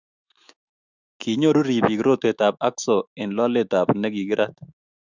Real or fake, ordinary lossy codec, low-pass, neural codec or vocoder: fake; Opus, 64 kbps; 7.2 kHz; autoencoder, 48 kHz, 128 numbers a frame, DAC-VAE, trained on Japanese speech